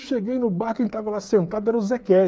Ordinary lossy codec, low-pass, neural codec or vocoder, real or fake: none; none; codec, 16 kHz, 8 kbps, FreqCodec, smaller model; fake